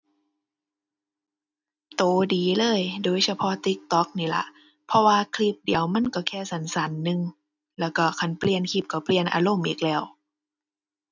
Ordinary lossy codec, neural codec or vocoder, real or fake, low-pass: none; none; real; 7.2 kHz